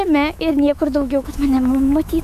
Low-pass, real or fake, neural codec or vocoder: 14.4 kHz; fake; autoencoder, 48 kHz, 128 numbers a frame, DAC-VAE, trained on Japanese speech